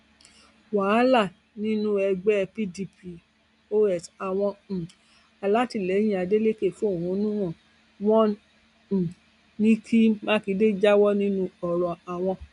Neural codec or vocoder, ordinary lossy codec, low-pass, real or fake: none; none; 10.8 kHz; real